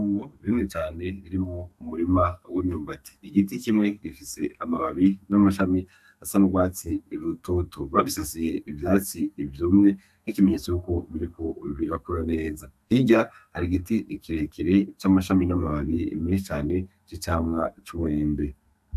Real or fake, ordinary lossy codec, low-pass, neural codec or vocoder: fake; AAC, 96 kbps; 14.4 kHz; codec, 32 kHz, 1.9 kbps, SNAC